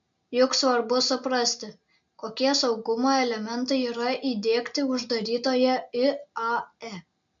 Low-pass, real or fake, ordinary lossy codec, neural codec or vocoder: 7.2 kHz; real; MP3, 64 kbps; none